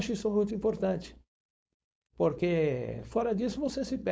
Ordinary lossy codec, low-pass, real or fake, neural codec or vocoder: none; none; fake; codec, 16 kHz, 4.8 kbps, FACodec